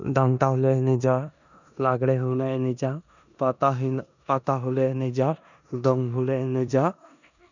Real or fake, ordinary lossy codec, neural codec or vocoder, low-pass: fake; none; codec, 16 kHz in and 24 kHz out, 0.9 kbps, LongCat-Audio-Codec, four codebook decoder; 7.2 kHz